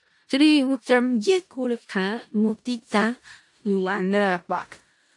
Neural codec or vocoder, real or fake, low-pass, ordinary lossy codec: codec, 16 kHz in and 24 kHz out, 0.4 kbps, LongCat-Audio-Codec, four codebook decoder; fake; 10.8 kHz; AAC, 64 kbps